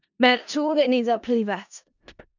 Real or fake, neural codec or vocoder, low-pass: fake; codec, 16 kHz in and 24 kHz out, 0.4 kbps, LongCat-Audio-Codec, four codebook decoder; 7.2 kHz